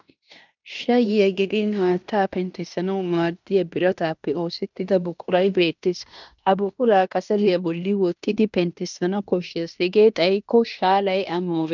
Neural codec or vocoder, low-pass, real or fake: codec, 16 kHz in and 24 kHz out, 0.9 kbps, LongCat-Audio-Codec, fine tuned four codebook decoder; 7.2 kHz; fake